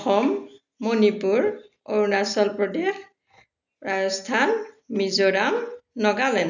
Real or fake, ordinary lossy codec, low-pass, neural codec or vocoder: real; none; 7.2 kHz; none